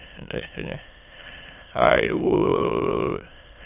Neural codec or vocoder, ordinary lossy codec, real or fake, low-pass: autoencoder, 22.05 kHz, a latent of 192 numbers a frame, VITS, trained on many speakers; AAC, 32 kbps; fake; 3.6 kHz